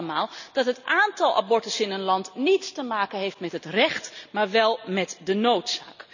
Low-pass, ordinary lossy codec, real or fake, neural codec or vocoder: 7.2 kHz; none; real; none